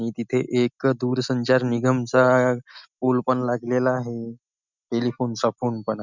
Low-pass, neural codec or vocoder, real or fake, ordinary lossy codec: 7.2 kHz; none; real; none